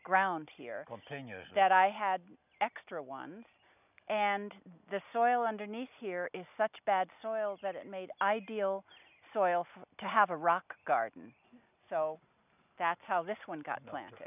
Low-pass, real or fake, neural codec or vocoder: 3.6 kHz; real; none